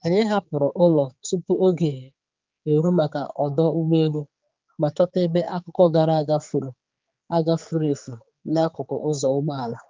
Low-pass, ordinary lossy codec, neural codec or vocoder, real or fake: 7.2 kHz; Opus, 24 kbps; codec, 16 kHz, 4 kbps, X-Codec, HuBERT features, trained on general audio; fake